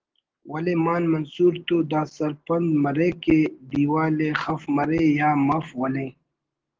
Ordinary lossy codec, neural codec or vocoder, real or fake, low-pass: Opus, 16 kbps; none; real; 7.2 kHz